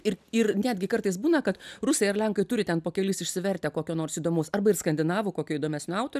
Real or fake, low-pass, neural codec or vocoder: real; 14.4 kHz; none